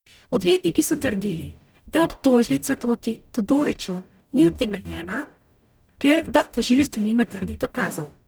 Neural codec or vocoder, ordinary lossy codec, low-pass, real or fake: codec, 44.1 kHz, 0.9 kbps, DAC; none; none; fake